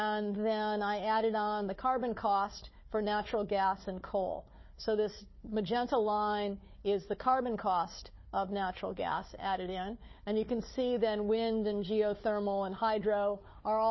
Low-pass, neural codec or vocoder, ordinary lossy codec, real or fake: 7.2 kHz; codec, 16 kHz, 4 kbps, FunCodec, trained on Chinese and English, 50 frames a second; MP3, 24 kbps; fake